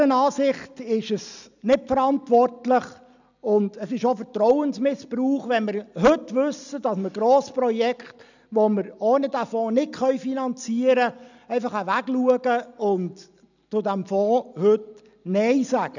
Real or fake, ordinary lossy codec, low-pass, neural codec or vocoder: real; none; 7.2 kHz; none